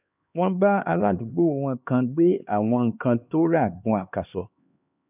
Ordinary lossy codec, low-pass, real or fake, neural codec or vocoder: none; 3.6 kHz; fake; codec, 16 kHz, 2 kbps, X-Codec, HuBERT features, trained on LibriSpeech